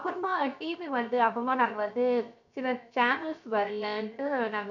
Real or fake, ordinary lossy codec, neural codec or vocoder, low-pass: fake; none; codec, 16 kHz, 0.7 kbps, FocalCodec; 7.2 kHz